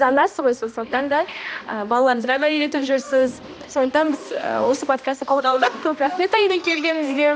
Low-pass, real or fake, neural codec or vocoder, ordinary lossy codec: none; fake; codec, 16 kHz, 1 kbps, X-Codec, HuBERT features, trained on balanced general audio; none